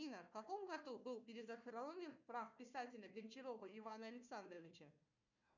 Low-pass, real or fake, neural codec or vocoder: 7.2 kHz; fake; codec, 16 kHz, 1 kbps, FunCodec, trained on Chinese and English, 50 frames a second